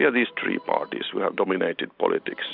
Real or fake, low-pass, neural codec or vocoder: real; 5.4 kHz; none